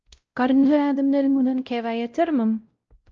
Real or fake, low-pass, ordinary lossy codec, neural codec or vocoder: fake; 7.2 kHz; Opus, 24 kbps; codec, 16 kHz, 0.5 kbps, X-Codec, WavLM features, trained on Multilingual LibriSpeech